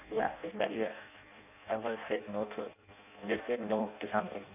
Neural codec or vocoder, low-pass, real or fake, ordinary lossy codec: codec, 16 kHz in and 24 kHz out, 0.6 kbps, FireRedTTS-2 codec; 3.6 kHz; fake; none